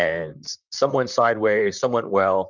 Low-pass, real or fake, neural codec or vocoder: 7.2 kHz; fake; vocoder, 44.1 kHz, 128 mel bands, Pupu-Vocoder